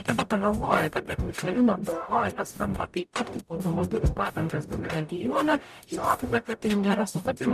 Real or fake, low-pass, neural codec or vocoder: fake; 14.4 kHz; codec, 44.1 kHz, 0.9 kbps, DAC